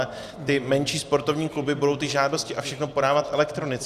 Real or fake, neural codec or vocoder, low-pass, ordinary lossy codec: real; none; 14.4 kHz; Opus, 64 kbps